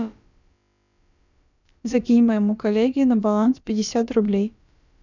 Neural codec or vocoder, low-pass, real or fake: codec, 16 kHz, about 1 kbps, DyCAST, with the encoder's durations; 7.2 kHz; fake